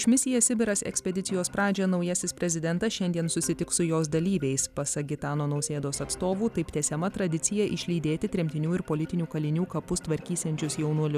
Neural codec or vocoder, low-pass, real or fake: none; 14.4 kHz; real